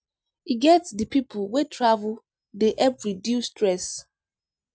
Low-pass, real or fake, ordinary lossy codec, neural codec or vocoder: none; real; none; none